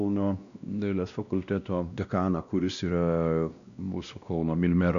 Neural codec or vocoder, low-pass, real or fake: codec, 16 kHz, 1 kbps, X-Codec, WavLM features, trained on Multilingual LibriSpeech; 7.2 kHz; fake